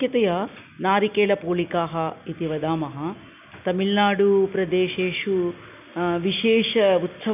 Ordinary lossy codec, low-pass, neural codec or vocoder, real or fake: none; 3.6 kHz; none; real